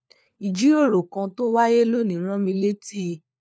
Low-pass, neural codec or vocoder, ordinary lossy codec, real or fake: none; codec, 16 kHz, 4 kbps, FunCodec, trained on LibriTTS, 50 frames a second; none; fake